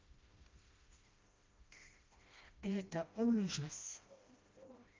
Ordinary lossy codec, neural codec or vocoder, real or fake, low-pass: Opus, 24 kbps; codec, 16 kHz, 1 kbps, FreqCodec, smaller model; fake; 7.2 kHz